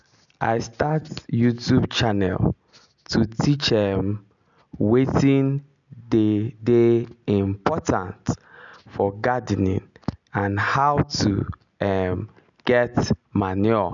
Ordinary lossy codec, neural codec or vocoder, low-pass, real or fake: none; none; 7.2 kHz; real